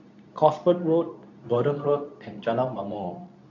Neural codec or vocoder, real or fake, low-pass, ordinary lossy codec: codec, 24 kHz, 0.9 kbps, WavTokenizer, medium speech release version 2; fake; 7.2 kHz; none